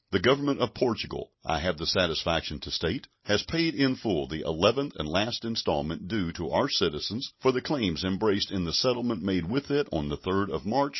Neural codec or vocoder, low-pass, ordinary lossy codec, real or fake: none; 7.2 kHz; MP3, 24 kbps; real